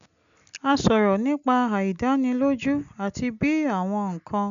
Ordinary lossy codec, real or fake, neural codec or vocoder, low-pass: none; real; none; 7.2 kHz